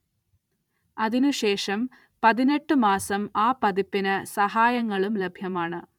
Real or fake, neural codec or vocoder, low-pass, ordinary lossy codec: fake; vocoder, 44.1 kHz, 128 mel bands every 512 samples, BigVGAN v2; 19.8 kHz; none